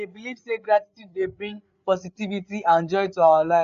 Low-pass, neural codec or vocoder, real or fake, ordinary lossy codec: 7.2 kHz; codec, 16 kHz, 8 kbps, FreqCodec, larger model; fake; none